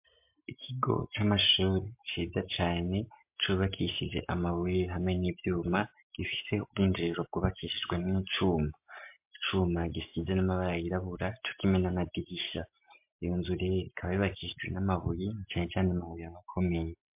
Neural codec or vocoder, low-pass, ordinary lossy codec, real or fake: none; 3.6 kHz; MP3, 32 kbps; real